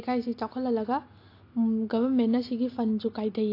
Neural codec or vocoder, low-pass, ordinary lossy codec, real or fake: none; 5.4 kHz; AAC, 32 kbps; real